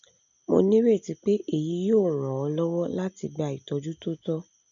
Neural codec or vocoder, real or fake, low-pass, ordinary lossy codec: none; real; 7.2 kHz; none